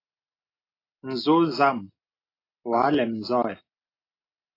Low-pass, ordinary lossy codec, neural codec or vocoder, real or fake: 5.4 kHz; AAC, 24 kbps; none; real